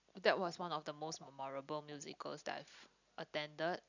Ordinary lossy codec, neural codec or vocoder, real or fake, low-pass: none; none; real; 7.2 kHz